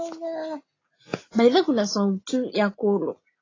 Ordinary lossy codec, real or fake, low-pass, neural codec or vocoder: AAC, 32 kbps; real; 7.2 kHz; none